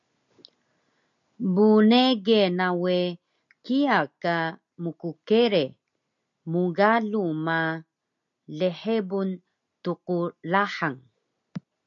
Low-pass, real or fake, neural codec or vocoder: 7.2 kHz; real; none